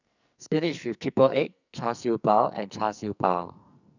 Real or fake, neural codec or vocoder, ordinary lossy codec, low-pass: fake; codec, 44.1 kHz, 2.6 kbps, SNAC; none; 7.2 kHz